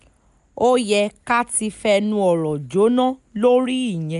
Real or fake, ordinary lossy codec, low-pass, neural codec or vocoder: real; none; 10.8 kHz; none